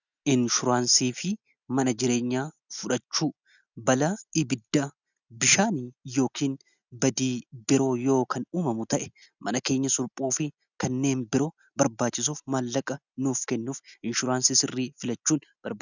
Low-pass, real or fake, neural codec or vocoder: 7.2 kHz; real; none